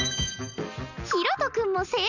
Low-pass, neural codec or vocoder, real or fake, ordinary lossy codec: 7.2 kHz; none; real; none